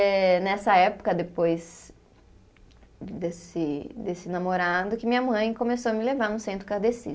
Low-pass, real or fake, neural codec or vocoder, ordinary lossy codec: none; real; none; none